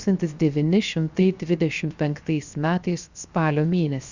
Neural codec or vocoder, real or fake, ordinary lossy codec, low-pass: codec, 16 kHz, 0.7 kbps, FocalCodec; fake; Opus, 64 kbps; 7.2 kHz